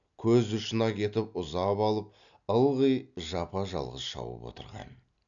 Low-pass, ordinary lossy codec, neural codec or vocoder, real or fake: 7.2 kHz; none; none; real